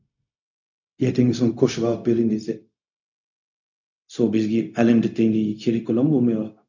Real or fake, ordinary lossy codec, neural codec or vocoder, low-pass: fake; none; codec, 16 kHz, 0.4 kbps, LongCat-Audio-Codec; 7.2 kHz